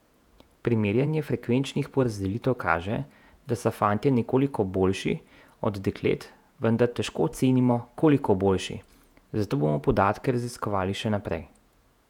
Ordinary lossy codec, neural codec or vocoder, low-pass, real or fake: none; vocoder, 48 kHz, 128 mel bands, Vocos; 19.8 kHz; fake